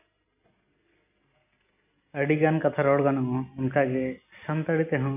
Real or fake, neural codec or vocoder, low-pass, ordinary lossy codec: real; none; 3.6 kHz; AAC, 32 kbps